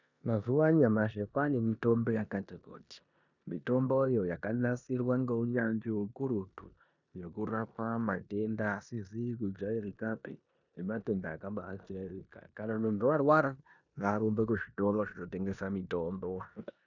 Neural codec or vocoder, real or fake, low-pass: codec, 16 kHz in and 24 kHz out, 0.9 kbps, LongCat-Audio-Codec, four codebook decoder; fake; 7.2 kHz